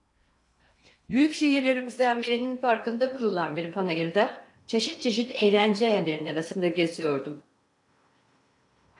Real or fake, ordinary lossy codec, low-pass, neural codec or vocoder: fake; AAC, 64 kbps; 10.8 kHz; codec, 16 kHz in and 24 kHz out, 0.8 kbps, FocalCodec, streaming, 65536 codes